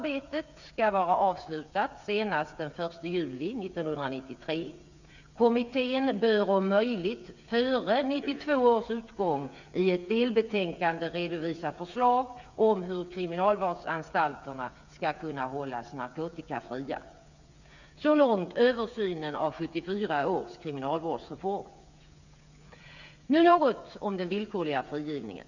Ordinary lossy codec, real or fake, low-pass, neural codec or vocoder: none; fake; 7.2 kHz; codec, 16 kHz, 8 kbps, FreqCodec, smaller model